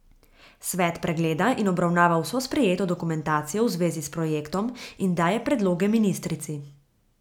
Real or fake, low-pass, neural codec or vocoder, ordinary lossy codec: real; 19.8 kHz; none; none